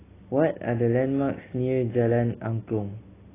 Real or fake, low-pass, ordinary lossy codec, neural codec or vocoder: real; 3.6 kHz; AAC, 16 kbps; none